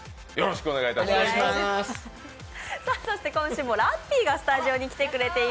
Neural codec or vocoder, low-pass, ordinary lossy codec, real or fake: none; none; none; real